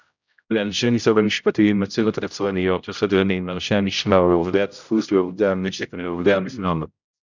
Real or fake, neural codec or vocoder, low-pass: fake; codec, 16 kHz, 0.5 kbps, X-Codec, HuBERT features, trained on general audio; 7.2 kHz